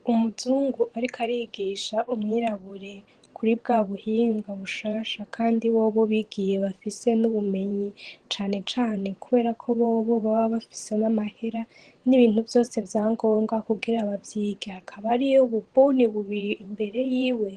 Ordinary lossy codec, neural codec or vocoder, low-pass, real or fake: Opus, 16 kbps; vocoder, 44.1 kHz, 128 mel bands every 512 samples, BigVGAN v2; 10.8 kHz; fake